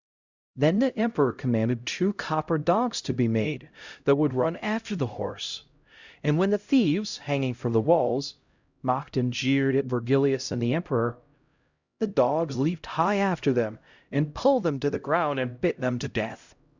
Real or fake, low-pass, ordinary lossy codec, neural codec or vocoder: fake; 7.2 kHz; Opus, 64 kbps; codec, 16 kHz, 0.5 kbps, X-Codec, HuBERT features, trained on LibriSpeech